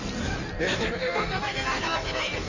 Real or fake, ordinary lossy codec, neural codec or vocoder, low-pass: fake; none; codec, 16 kHz in and 24 kHz out, 1.1 kbps, FireRedTTS-2 codec; 7.2 kHz